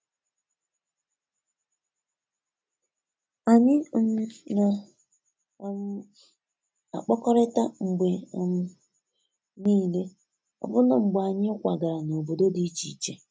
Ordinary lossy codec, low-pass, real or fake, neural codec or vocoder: none; none; real; none